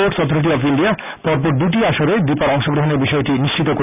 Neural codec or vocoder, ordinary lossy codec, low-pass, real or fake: none; none; 3.6 kHz; real